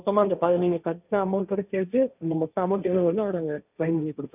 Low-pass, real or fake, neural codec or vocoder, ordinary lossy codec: 3.6 kHz; fake; codec, 16 kHz, 1.1 kbps, Voila-Tokenizer; none